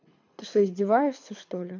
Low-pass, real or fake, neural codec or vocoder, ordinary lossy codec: 7.2 kHz; fake; codec, 24 kHz, 6 kbps, HILCodec; MP3, 48 kbps